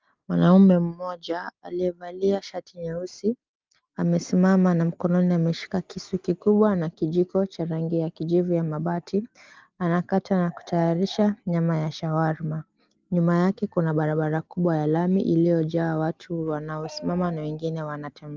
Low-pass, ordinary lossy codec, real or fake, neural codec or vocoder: 7.2 kHz; Opus, 32 kbps; real; none